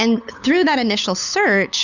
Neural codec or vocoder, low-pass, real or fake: codec, 16 kHz, 8 kbps, FunCodec, trained on LibriTTS, 25 frames a second; 7.2 kHz; fake